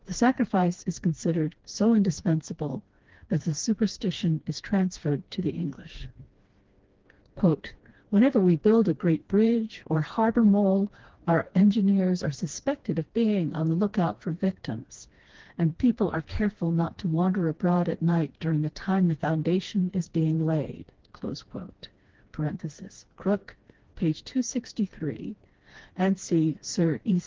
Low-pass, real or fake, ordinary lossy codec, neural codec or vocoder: 7.2 kHz; fake; Opus, 16 kbps; codec, 16 kHz, 2 kbps, FreqCodec, smaller model